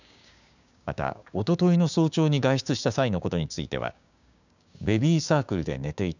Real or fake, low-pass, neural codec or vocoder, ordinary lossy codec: fake; 7.2 kHz; codec, 16 kHz, 6 kbps, DAC; none